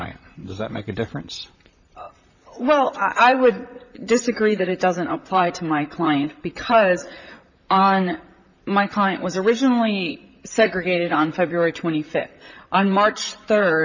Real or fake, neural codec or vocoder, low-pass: fake; vocoder, 44.1 kHz, 128 mel bands, Pupu-Vocoder; 7.2 kHz